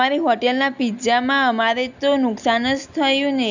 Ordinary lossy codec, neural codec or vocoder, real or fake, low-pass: none; none; real; 7.2 kHz